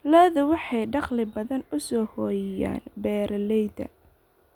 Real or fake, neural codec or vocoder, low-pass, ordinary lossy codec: real; none; 19.8 kHz; none